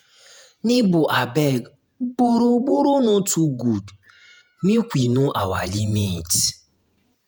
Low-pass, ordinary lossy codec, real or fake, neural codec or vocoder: none; none; fake; vocoder, 48 kHz, 128 mel bands, Vocos